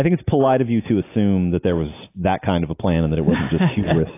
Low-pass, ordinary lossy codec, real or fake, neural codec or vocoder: 3.6 kHz; AAC, 24 kbps; real; none